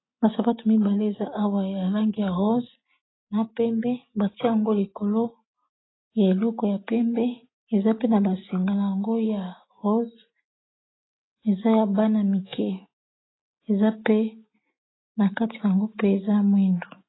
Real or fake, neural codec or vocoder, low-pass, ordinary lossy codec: real; none; 7.2 kHz; AAC, 16 kbps